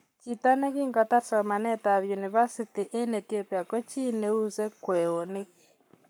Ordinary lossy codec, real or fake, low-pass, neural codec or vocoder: none; fake; none; codec, 44.1 kHz, 7.8 kbps, Pupu-Codec